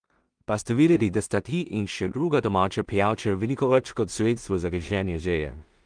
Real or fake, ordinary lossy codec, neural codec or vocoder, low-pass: fake; Opus, 32 kbps; codec, 16 kHz in and 24 kHz out, 0.4 kbps, LongCat-Audio-Codec, two codebook decoder; 9.9 kHz